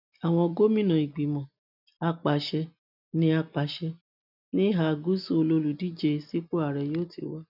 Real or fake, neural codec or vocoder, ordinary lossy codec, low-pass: real; none; none; 5.4 kHz